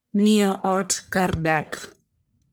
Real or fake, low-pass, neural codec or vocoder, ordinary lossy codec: fake; none; codec, 44.1 kHz, 1.7 kbps, Pupu-Codec; none